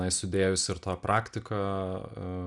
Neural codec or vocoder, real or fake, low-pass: none; real; 10.8 kHz